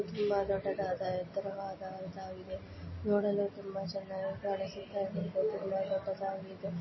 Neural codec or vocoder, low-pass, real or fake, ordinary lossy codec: none; 7.2 kHz; real; MP3, 24 kbps